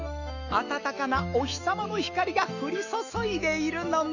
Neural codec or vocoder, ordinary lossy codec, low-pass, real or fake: autoencoder, 48 kHz, 128 numbers a frame, DAC-VAE, trained on Japanese speech; none; 7.2 kHz; fake